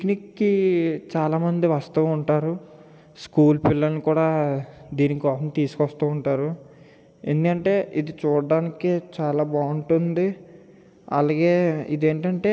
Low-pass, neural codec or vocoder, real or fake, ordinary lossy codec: none; none; real; none